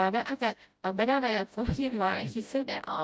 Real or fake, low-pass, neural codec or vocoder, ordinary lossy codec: fake; none; codec, 16 kHz, 0.5 kbps, FreqCodec, smaller model; none